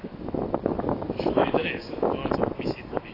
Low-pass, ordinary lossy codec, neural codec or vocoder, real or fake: 5.4 kHz; AAC, 24 kbps; autoencoder, 48 kHz, 128 numbers a frame, DAC-VAE, trained on Japanese speech; fake